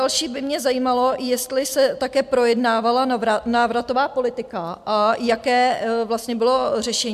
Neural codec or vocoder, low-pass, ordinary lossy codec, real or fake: none; 14.4 kHz; MP3, 96 kbps; real